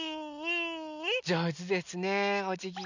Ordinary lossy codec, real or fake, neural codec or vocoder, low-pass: none; real; none; 7.2 kHz